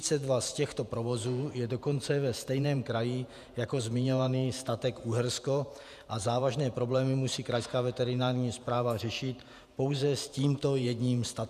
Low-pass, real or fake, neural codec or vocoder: 14.4 kHz; fake; vocoder, 48 kHz, 128 mel bands, Vocos